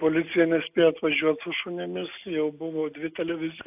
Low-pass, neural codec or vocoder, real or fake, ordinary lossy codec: 3.6 kHz; none; real; AAC, 32 kbps